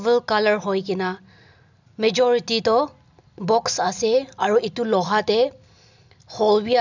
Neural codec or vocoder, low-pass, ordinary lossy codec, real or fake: none; 7.2 kHz; none; real